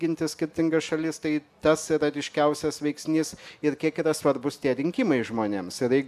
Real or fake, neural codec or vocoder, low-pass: real; none; 14.4 kHz